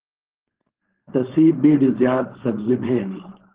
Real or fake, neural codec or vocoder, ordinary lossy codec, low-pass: fake; codec, 16 kHz, 4.8 kbps, FACodec; Opus, 16 kbps; 3.6 kHz